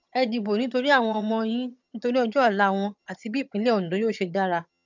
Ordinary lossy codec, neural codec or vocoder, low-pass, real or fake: none; vocoder, 22.05 kHz, 80 mel bands, HiFi-GAN; 7.2 kHz; fake